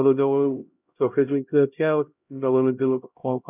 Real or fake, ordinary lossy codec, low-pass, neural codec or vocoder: fake; none; 3.6 kHz; codec, 16 kHz, 0.5 kbps, X-Codec, HuBERT features, trained on LibriSpeech